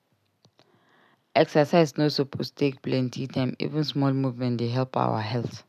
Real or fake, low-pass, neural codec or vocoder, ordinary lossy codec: real; 14.4 kHz; none; none